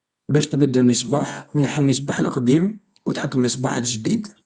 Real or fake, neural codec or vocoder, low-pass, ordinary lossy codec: fake; codec, 24 kHz, 0.9 kbps, WavTokenizer, medium music audio release; 10.8 kHz; Opus, 64 kbps